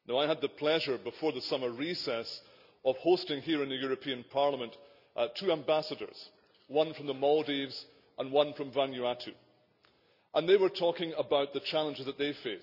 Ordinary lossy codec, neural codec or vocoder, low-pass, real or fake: none; none; 5.4 kHz; real